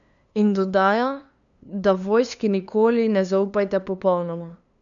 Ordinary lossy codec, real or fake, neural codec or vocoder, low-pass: MP3, 96 kbps; fake; codec, 16 kHz, 2 kbps, FunCodec, trained on LibriTTS, 25 frames a second; 7.2 kHz